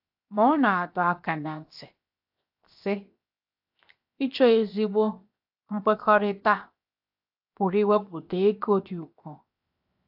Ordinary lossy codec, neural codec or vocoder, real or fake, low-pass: AAC, 48 kbps; codec, 16 kHz, 0.7 kbps, FocalCodec; fake; 5.4 kHz